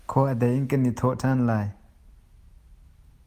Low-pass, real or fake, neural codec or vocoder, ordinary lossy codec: 14.4 kHz; real; none; Opus, 32 kbps